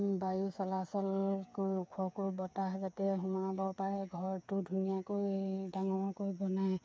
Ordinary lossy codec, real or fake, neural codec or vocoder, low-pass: none; fake; codec, 16 kHz, 8 kbps, FreqCodec, smaller model; none